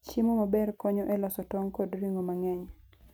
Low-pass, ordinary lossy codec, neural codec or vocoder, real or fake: none; none; none; real